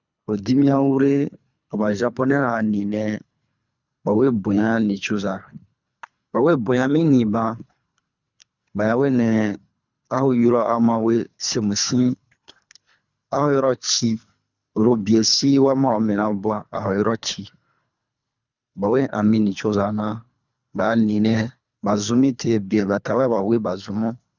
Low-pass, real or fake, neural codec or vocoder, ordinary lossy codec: 7.2 kHz; fake; codec, 24 kHz, 3 kbps, HILCodec; none